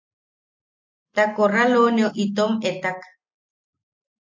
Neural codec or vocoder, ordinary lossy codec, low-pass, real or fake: none; AAC, 48 kbps; 7.2 kHz; real